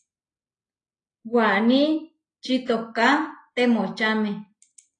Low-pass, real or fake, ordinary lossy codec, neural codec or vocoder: 9.9 kHz; real; AAC, 32 kbps; none